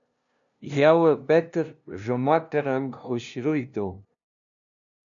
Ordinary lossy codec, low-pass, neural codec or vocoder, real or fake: AAC, 64 kbps; 7.2 kHz; codec, 16 kHz, 0.5 kbps, FunCodec, trained on LibriTTS, 25 frames a second; fake